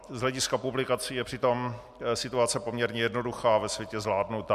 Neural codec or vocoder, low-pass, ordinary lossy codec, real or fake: none; 14.4 kHz; MP3, 96 kbps; real